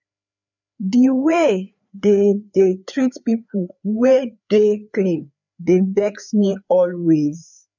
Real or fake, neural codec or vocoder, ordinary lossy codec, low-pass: fake; codec, 16 kHz, 4 kbps, FreqCodec, larger model; none; 7.2 kHz